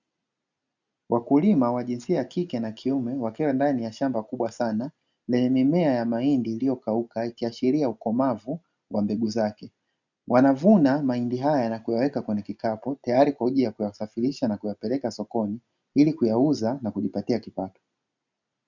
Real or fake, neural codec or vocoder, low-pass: real; none; 7.2 kHz